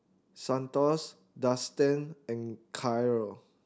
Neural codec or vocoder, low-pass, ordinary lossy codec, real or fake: none; none; none; real